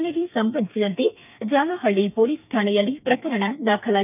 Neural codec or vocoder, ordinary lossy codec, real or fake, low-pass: codec, 32 kHz, 1.9 kbps, SNAC; none; fake; 3.6 kHz